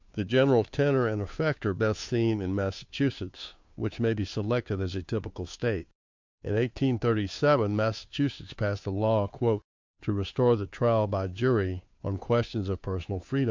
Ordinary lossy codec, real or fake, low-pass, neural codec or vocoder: MP3, 64 kbps; fake; 7.2 kHz; codec, 16 kHz, 2 kbps, FunCodec, trained on Chinese and English, 25 frames a second